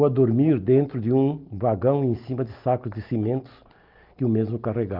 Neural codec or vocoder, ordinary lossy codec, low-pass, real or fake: none; Opus, 32 kbps; 5.4 kHz; real